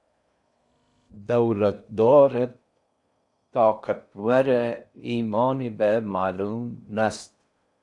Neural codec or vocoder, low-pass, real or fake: codec, 16 kHz in and 24 kHz out, 0.8 kbps, FocalCodec, streaming, 65536 codes; 10.8 kHz; fake